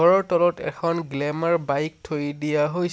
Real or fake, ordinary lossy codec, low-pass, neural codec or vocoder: real; none; none; none